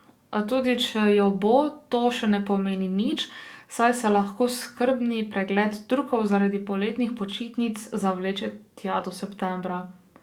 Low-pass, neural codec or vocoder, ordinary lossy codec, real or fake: 19.8 kHz; codec, 44.1 kHz, 7.8 kbps, DAC; Opus, 64 kbps; fake